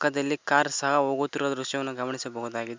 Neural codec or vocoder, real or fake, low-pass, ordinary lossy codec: none; real; 7.2 kHz; MP3, 64 kbps